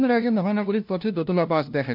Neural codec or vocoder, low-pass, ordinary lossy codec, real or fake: codec, 16 kHz, 0.5 kbps, FunCodec, trained on LibriTTS, 25 frames a second; 5.4 kHz; MP3, 48 kbps; fake